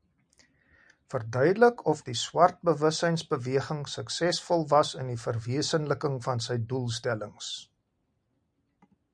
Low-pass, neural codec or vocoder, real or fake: 9.9 kHz; none; real